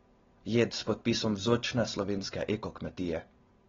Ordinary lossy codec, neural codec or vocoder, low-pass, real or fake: AAC, 24 kbps; none; 7.2 kHz; real